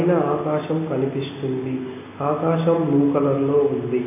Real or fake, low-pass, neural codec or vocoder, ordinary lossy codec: real; 3.6 kHz; none; MP3, 24 kbps